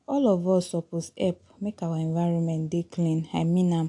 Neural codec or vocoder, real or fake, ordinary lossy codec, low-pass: none; real; AAC, 64 kbps; 10.8 kHz